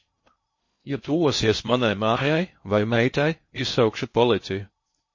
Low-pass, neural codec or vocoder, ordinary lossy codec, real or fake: 7.2 kHz; codec, 16 kHz in and 24 kHz out, 0.6 kbps, FocalCodec, streaming, 2048 codes; MP3, 32 kbps; fake